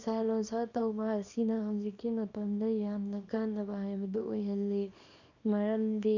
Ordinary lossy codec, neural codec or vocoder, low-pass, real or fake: none; codec, 24 kHz, 0.9 kbps, WavTokenizer, small release; 7.2 kHz; fake